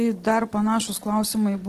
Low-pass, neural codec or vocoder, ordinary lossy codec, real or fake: 14.4 kHz; none; Opus, 16 kbps; real